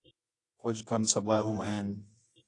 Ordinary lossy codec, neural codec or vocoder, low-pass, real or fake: AAC, 48 kbps; codec, 24 kHz, 0.9 kbps, WavTokenizer, medium music audio release; 10.8 kHz; fake